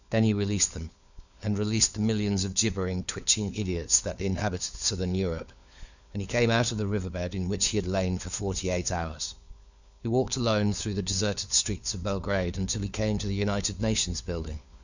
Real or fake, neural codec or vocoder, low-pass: fake; codec, 16 kHz, 4 kbps, FunCodec, trained on LibriTTS, 50 frames a second; 7.2 kHz